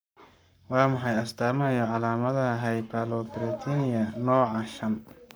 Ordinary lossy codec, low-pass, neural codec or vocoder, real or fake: none; none; codec, 44.1 kHz, 7.8 kbps, Pupu-Codec; fake